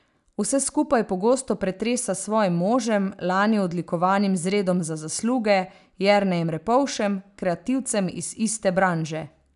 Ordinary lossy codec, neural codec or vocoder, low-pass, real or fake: none; none; 10.8 kHz; real